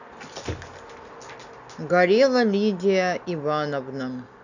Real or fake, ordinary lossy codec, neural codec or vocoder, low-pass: real; none; none; 7.2 kHz